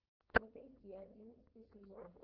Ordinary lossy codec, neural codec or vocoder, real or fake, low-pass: Opus, 16 kbps; codec, 16 kHz, 4.8 kbps, FACodec; fake; 5.4 kHz